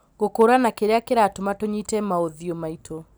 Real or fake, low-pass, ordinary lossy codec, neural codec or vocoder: real; none; none; none